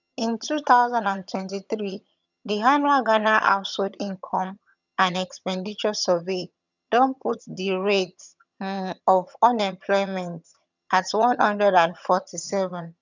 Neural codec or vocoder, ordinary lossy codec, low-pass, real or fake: vocoder, 22.05 kHz, 80 mel bands, HiFi-GAN; none; 7.2 kHz; fake